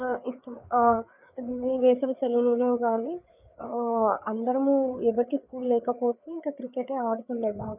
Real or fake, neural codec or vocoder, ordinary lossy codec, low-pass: fake; codec, 16 kHz, 4 kbps, FreqCodec, larger model; none; 3.6 kHz